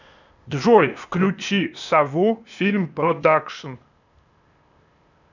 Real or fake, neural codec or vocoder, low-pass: fake; codec, 16 kHz, 0.8 kbps, ZipCodec; 7.2 kHz